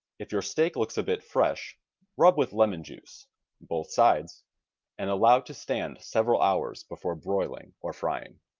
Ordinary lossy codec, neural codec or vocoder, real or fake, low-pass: Opus, 24 kbps; none; real; 7.2 kHz